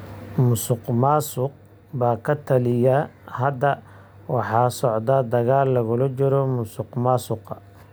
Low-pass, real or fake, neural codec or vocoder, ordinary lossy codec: none; real; none; none